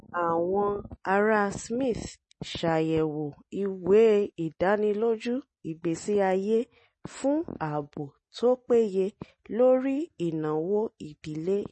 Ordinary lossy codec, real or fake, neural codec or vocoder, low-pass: MP3, 32 kbps; real; none; 10.8 kHz